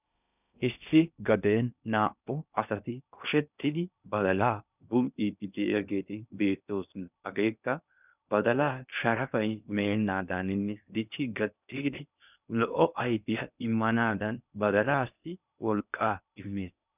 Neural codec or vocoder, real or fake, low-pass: codec, 16 kHz in and 24 kHz out, 0.6 kbps, FocalCodec, streaming, 2048 codes; fake; 3.6 kHz